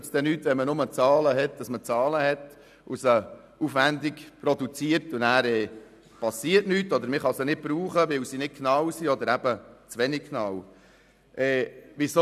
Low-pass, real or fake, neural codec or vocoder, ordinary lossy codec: 14.4 kHz; real; none; none